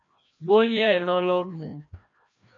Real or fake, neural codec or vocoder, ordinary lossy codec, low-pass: fake; codec, 16 kHz, 1 kbps, FreqCodec, larger model; AAC, 64 kbps; 7.2 kHz